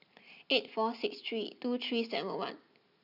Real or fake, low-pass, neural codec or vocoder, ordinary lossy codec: real; 5.4 kHz; none; none